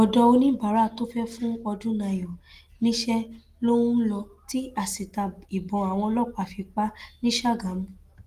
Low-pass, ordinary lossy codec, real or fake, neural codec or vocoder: 14.4 kHz; Opus, 24 kbps; real; none